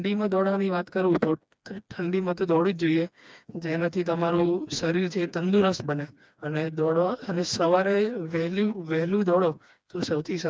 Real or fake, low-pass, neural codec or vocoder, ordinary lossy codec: fake; none; codec, 16 kHz, 2 kbps, FreqCodec, smaller model; none